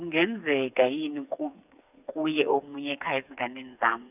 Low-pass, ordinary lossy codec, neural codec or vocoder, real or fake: 3.6 kHz; none; codec, 16 kHz, 4 kbps, FreqCodec, smaller model; fake